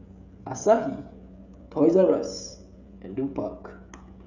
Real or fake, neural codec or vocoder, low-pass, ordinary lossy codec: fake; codec, 16 kHz, 16 kbps, FreqCodec, smaller model; 7.2 kHz; none